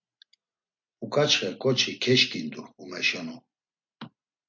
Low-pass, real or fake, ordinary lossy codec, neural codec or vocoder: 7.2 kHz; real; MP3, 48 kbps; none